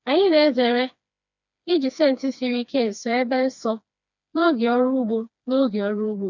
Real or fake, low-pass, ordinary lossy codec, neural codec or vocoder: fake; 7.2 kHz; none; codec, 16 kHz, 2 kbps, FreqCodec, smaller model